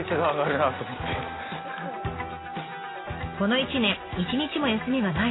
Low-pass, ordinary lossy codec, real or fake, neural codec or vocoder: 7.2 kHz; AAC, 16 kbps; real; none